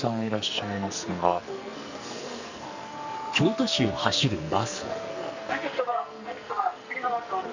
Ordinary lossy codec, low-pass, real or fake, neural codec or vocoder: none; 7.2 kHz; fake; codec, 44.1 kHz, 2.6 kbps, SNAC